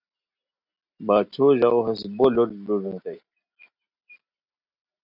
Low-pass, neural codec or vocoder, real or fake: 5.4 kHz; none; real